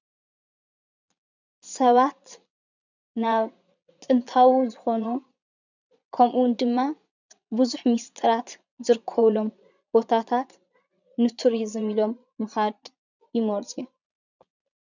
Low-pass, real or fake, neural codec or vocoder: 7.2 kHz; fake; vocoder, 22.05 kHz, 80 mel bands, Vocos